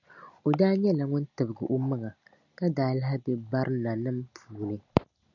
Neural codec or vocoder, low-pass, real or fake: none; 7.2 kHz; real